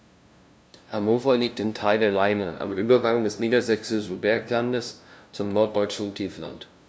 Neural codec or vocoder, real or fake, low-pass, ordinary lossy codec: codec, 16 kHz, 0.5 kbps, FunCodec, trained on LibriTTS, 25 frames a second; fake; none; none